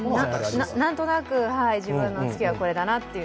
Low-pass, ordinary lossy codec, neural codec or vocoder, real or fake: none; none; none; real